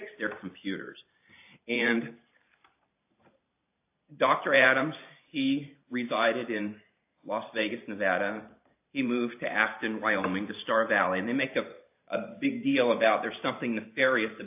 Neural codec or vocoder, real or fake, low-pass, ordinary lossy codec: vocoder, 44.1 kHz, 128 mel bands every 512 samples, BigVGAN v2; fake; 3.6 kHz; AAC, 32 kbps